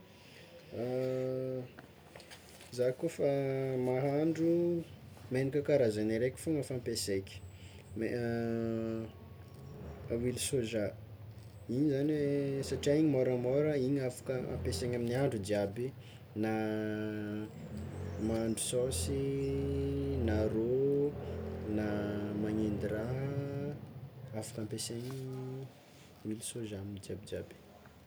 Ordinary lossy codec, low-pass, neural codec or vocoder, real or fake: none; none; none; real